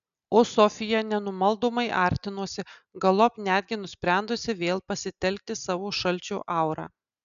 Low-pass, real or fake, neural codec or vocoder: 7.2 kHz; real; none